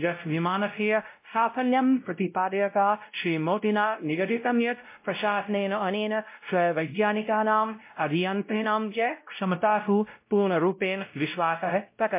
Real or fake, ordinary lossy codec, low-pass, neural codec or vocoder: fake; MP3, 24 kbps; 3.6 kHz; codec, 16 kHz, 0.5 kbps, X-Codec, WavLM features, trained on Multilingual LibriSpeech